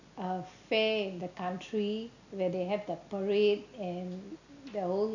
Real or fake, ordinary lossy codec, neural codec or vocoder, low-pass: real; none; none; 7.2 kHz